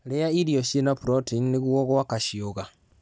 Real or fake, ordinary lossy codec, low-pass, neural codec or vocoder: real; none; none; none